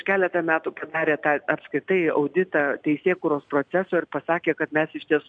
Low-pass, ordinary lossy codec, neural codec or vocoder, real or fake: 9.9 kHz; MP3, 64 kbps; none; real